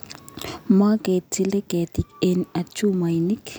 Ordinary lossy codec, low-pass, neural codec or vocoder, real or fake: none; none; none; real